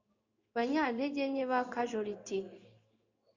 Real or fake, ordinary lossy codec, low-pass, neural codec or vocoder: fake; Opus, 64 kbps; 7.2 kHz; codec, 16 kHz in and 24 kHz out, 1 kbps, XY-Tokenizer